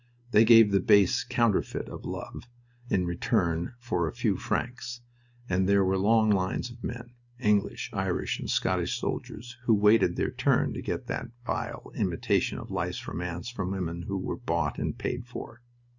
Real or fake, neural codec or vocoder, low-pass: real; none; 7.2 kHz